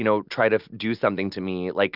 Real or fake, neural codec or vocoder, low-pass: real; none; 5.4 kHz